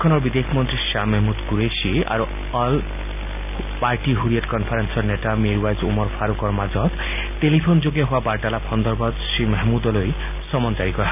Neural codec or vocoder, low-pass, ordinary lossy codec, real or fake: none; 3.6 kHz; none; real